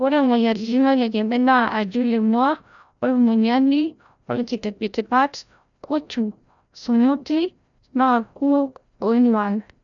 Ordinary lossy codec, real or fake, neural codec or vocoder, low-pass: none; fake; codec, 16 kHz, 0.5 kbps, FreqCodec, larger model; 7.2 kHz